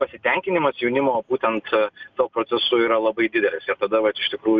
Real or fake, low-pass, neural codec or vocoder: real; 7.2 kHz; none